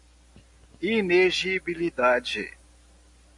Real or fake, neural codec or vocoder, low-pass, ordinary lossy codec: real; none; 10.8 kHz; AAC, 64 kbps